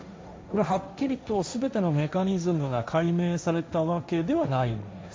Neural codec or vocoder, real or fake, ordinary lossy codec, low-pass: codec, 16 kHz, 1.1 kbps, Voila-Tokenizer; fake; none; none